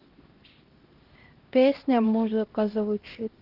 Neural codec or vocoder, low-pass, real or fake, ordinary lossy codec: codec, 16 kHz, 1 kbps, X-Codec, HuBERT features, trained on LibriSpeech; 5.4 kHz; fake; Opus, 16 kbps